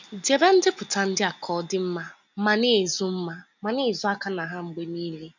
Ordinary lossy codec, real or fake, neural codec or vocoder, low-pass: none; real; none; 7.2 kHz